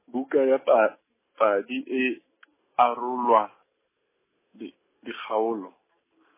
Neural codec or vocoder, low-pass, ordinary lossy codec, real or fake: none; 3.6 kHz; MP3, 16 kbps; real